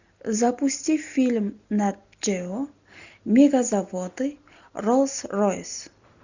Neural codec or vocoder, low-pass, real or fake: none; 7.2 kHz; real